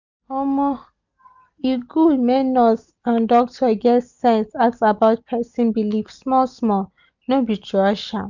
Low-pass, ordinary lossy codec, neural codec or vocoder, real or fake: 7.2 kHz; none; none; real